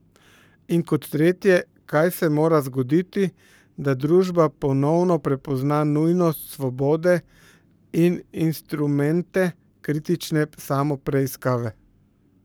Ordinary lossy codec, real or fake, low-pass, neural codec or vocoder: none; fake; none; codec, 44.1 kHz, 7.8 kbps, Pupu-Codec